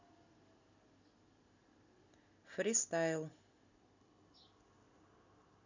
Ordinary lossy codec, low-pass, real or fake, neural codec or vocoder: none; 7.2 kHz; real; none